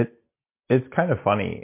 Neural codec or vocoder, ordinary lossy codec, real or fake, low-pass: none; MP3, 24 kbps; real; 3.6 kHz